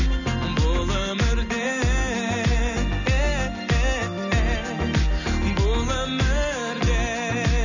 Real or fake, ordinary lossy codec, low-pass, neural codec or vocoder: real; none; 7.2 kHz; none